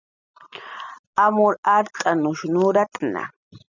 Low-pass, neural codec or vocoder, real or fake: 7.2 kHz; none; real